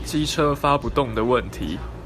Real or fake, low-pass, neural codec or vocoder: real; 14.4 kHz; none